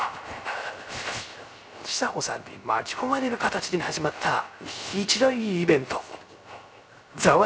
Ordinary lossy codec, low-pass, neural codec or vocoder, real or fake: none; none; codec, 16 kHz, 0.3 kbps, FocalCodec; fake